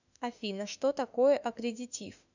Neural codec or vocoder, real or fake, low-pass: autoencoder, 48 kHz, 32 numbers a frame, DAC-VAE, trained on Japanese speech; fake; 7.2 kHz